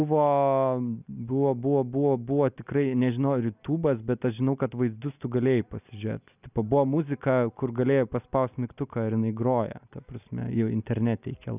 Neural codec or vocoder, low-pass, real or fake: none; 3.6 kHz; real